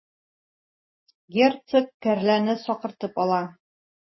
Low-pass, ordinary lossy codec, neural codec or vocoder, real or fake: 7.2 kHz; MP3, 24 kbps; none; real